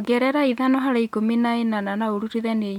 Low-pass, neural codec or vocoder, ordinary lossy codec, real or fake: 19.8 kHz; none; none; real